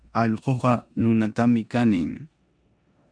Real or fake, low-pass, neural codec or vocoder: fake; 9.9 kHz; codec, 16 kHz in and 24 kHz out, 0.9 kbps, LongCat-Audio-Codec, four codebook decoder